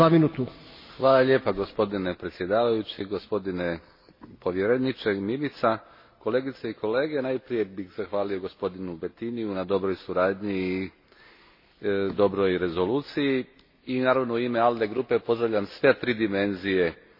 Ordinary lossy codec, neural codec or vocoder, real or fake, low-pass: MP3, 24 kbps; none; real; 5.4 kHz